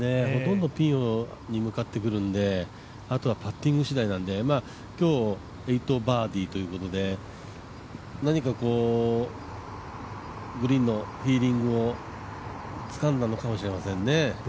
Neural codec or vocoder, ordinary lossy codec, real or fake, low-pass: none; none; real; none